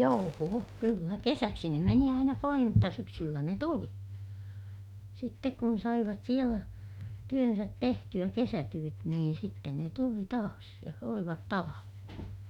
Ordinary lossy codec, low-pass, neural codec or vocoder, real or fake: none; 19.8 kHz; autoencoder, 48 kHz, 32 numbers a frame, DAC-VAE, trained on Japanese speech; fake